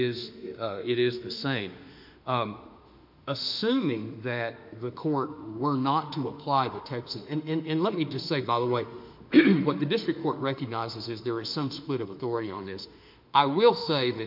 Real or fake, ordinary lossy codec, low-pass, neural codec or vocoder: fake; MP3, 48 kbps; 5.4 kHz; autoencoder, 48 kHz, 32 numbers a frame, DAC-VAE, trained on Japanese speech